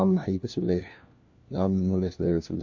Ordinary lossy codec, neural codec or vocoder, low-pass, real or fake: AAC, 48 kbps; codec, 16 kHz, 1 kbps, FunCodec, trained on LibriTTS, 50 frames a second; 7.2 kHz; fake